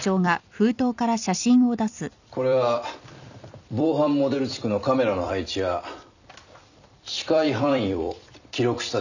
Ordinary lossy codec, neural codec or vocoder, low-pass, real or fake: none; vocoder, 44.1 kHz, 128 mel bands every 256 samples, BigVGAN v2; 7.2 kHz; fake